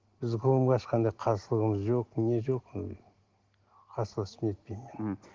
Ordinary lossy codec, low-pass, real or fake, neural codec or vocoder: Opus, 16 kbps; 7.2 kHz; real; none